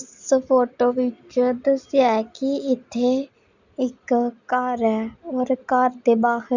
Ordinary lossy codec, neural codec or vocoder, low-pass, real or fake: Opus, 64 kbps; none; 7.2 kHz; real